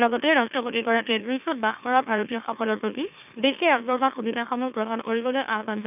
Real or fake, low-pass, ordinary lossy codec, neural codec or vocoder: fake; 3.6 kHz; none; autoencoder, 44.1 kHz, a latent of 192 numbers a frame, MeloTTS